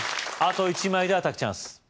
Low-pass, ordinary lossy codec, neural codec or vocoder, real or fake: none; none; none; real